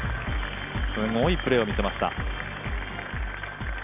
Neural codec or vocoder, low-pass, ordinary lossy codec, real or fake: none; 3.6 kHz; none; real